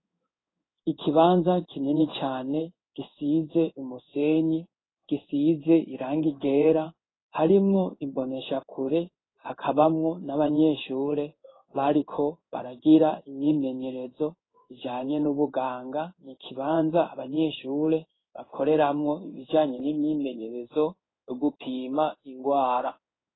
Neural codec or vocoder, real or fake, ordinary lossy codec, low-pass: codec, 16 kHz in and 24 kHz out, 1 kbps, XY-Tokenizer; fake; AAC, 16 kbps; 7.2 kHz